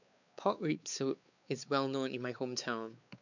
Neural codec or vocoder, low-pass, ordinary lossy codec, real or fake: codec, 16 kHz, 4 kbps, X-Codec, WavLM features, trained on Multilingual LibriSpeech; 7.2 kHz; none; fake